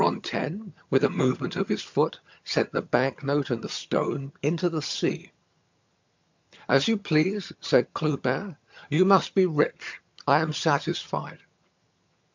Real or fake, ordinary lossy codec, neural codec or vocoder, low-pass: fake; MP3, 64 kbps; vocoder, 22.05 kHz, 80 mel bands, HiFi-GAN; 7.2 kHz